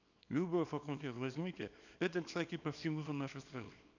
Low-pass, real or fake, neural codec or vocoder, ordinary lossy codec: 7.2 kHz; fake; codec, 24 kHz, 0.9 kbps, WavTokenizer, small release; none